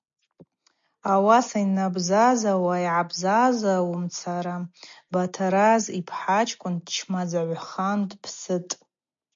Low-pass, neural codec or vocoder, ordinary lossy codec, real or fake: 7.2 kHz; none; MP3, 48 kbps; real